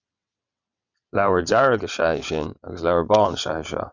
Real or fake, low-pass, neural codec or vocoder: fake; 7.2 kHz; vocoder, 22.05 kHz, 80 mel bands, WaveNeXt